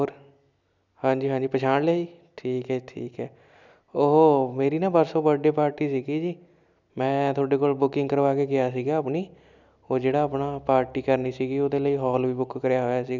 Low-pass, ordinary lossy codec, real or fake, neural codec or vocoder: 7.2 kHz; none; real; none